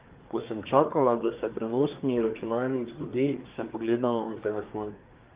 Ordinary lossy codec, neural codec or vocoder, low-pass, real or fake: Opus, 32 kbps; codec, 24 kHz, 1 kbps, SNAC; 3.6 kHz; fake